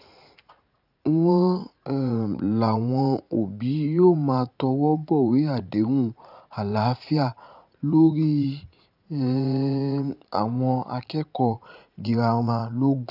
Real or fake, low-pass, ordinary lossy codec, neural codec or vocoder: fake; 5.4 kHz; none; vocoder, 44.1 kHz, 80 mel bands, Vocos